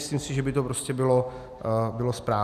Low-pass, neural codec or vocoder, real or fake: 14.4 kHz; none; real